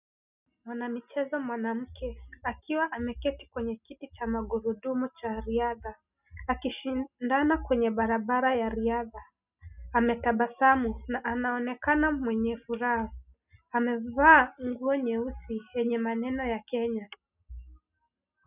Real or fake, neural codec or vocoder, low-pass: real; none; 3.6 kHz